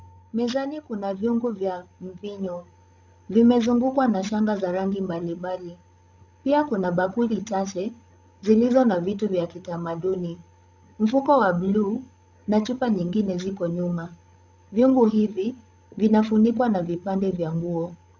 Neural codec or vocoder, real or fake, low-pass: codec, 16 kHz, 16 kbps, FreqCodec, larger model; fake; 7.2 kHz